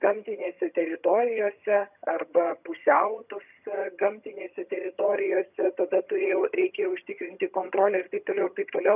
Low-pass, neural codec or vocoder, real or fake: 3.6 kHz; vocoder, 22.05 kHz, 80 mel bands, HiFi-GAN; fake